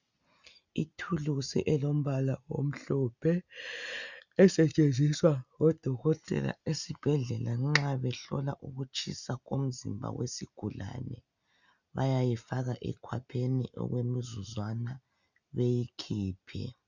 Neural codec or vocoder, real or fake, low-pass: none; real; 7.2 kHz